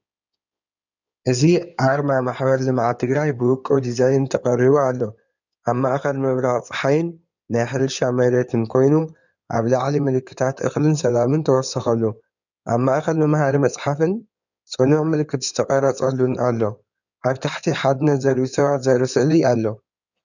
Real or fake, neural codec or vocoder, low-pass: fake; codec, 16 kHz in and 24 kHz out, 2.2 kbps, FireRedTTS-2 codec; 7.2 kHz